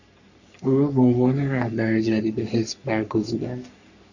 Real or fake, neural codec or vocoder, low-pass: fake; codec, 44.1 kHz, 3.4 kbps, Pupu-Codec; 7.2 kHz